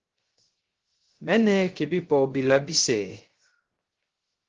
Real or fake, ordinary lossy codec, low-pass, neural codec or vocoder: fake; Opus, 16 kbps; 7.2 kHz; codec, 16 kHz, 0.3 kbps, FocalCodec